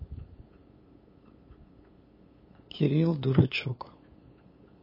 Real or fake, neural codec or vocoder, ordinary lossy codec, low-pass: fake; codec, 16 kHz, 8 kbps, FunCodec, trained on LibriTTS, 25 frames a second; MP3, 24 kbps; 5.4 kHz